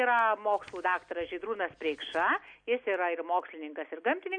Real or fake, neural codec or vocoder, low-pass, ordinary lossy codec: real; none; 9.9 kHz; MP3, 48 kbps